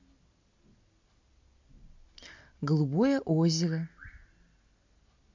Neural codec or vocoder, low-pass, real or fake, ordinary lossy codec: none; 7.2 kHz; real; MP3, 48 kbps